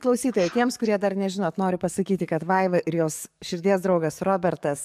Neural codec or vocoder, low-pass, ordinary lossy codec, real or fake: codec, 44.1 kHz, 7.8 kbps, DAC; 14.4 kHz; AAC, 96 kbps; fake